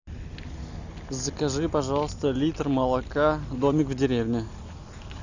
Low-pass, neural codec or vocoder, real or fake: 7.2 kHz; none; real